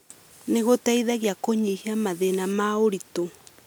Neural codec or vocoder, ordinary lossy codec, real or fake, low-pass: none; none; real; none